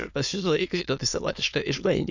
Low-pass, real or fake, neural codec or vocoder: 7.2 kHz; fake; autoencoder, 22.05 kHz, a latent of 192 numbers a frame, VITS, trained on many speakers